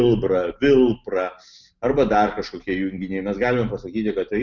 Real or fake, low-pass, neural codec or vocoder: real; 7.2 kHz; none